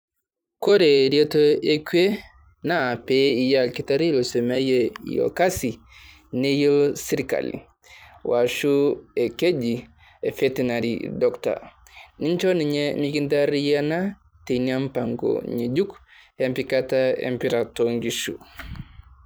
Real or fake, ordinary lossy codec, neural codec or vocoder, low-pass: fake; none; vocoder, 44.1 kHz, 128 mel bands every 256 samples, BigVGAN v2; none